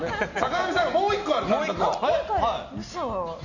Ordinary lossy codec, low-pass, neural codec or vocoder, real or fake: none; 7.2 kHz; none; real